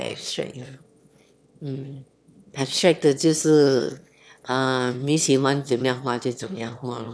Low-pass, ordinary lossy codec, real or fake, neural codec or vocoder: none; none; fake; autoencoder, 22.05 kHz, a latent of 192 numbers a frame, VITS, trained on one speaker